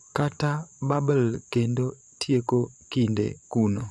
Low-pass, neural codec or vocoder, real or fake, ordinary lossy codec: none; vocoder, 24 kHz, 100 mel bands, Vocos; fake; none